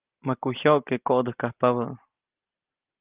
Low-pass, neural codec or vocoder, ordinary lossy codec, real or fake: 3.6 kHz; none; Opus, 32 kbps; real